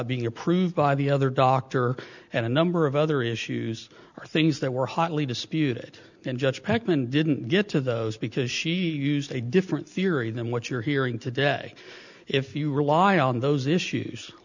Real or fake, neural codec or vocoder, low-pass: real; none; 7.2 kHz